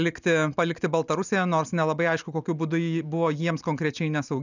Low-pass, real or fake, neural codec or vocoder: 7.2 kHz; real; none